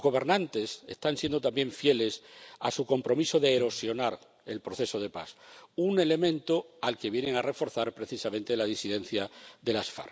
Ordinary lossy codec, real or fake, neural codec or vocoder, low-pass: none; real; none; none